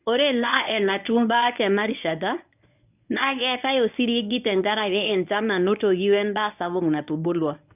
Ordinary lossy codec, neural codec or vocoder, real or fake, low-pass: none; codec, 24 kHz, 0.9 kbps, WavTokenizer, medium speech release version 1; fake; 3.6 kHz